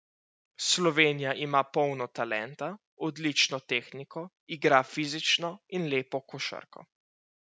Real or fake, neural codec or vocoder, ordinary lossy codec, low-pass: real; none; none; none